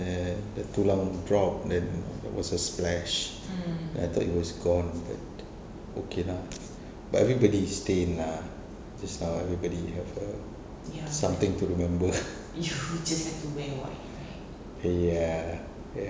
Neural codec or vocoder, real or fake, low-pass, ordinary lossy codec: none; real; none; none